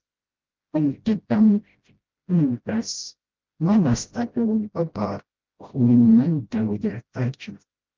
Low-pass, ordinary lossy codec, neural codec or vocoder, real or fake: 7.2 kHz; Opus, 32 kbps; codec, 16 kHz, 0.5 kbps, FreqCodec, smaller model; fake